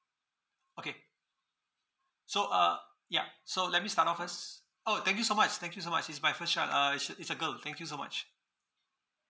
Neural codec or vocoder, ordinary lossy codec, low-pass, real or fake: none; none; none; real